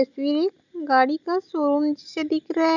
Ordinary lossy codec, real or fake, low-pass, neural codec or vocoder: none; real; 7.2 kHz; none